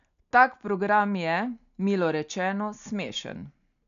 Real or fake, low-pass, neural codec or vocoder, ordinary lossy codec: real; 7.2 kHz; none; none